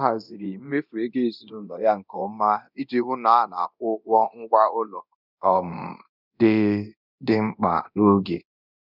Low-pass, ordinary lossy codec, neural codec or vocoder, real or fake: 5.4 kHz; none; codec, 24 kHz, 0.9 kbps, DualCodec; fake